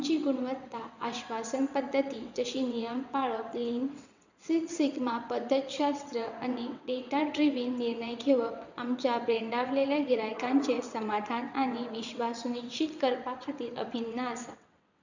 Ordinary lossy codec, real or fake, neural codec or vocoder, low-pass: none; fake; vocoder, 22.05 kHz, 80 mel bands, WaveNeXt; 7.2 kHz